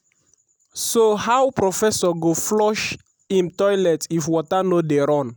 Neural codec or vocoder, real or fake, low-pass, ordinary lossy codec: none; real; none; none